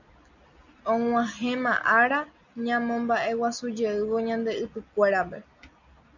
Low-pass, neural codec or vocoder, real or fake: 7.2 kHz; none; real